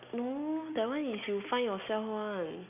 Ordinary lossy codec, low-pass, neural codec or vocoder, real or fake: none; 3.6 kHz; none; real